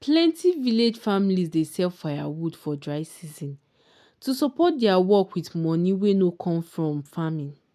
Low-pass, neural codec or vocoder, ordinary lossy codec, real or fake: 14.4 kHz; none; none; real